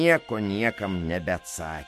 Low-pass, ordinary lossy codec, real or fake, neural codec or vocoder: 14.4 kHz; MP3, 64 kbps; fake; autoencoder, 48 kHz, 128 numbers a frame, DAC-VAE, trained on Japanese speech